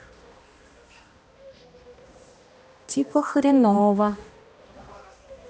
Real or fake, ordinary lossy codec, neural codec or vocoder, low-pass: fake; none; codec, 16 kHz, 1 kbps, X-Codec, HuBERT features, trained on balanced general audio; none